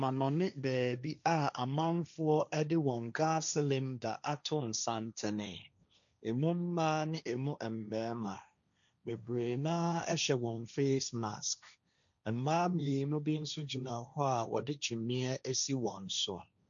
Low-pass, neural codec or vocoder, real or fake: 7.2 kHz; codec, 16 kHz, 1.1 kbps, Voila-Tokenizer; fake